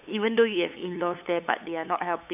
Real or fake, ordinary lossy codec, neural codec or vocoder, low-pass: fake; none; codec, 16 kHz, 8 kbps, FunCodec, trained on LibriTTS, 25 frames a second; 3.6 kHz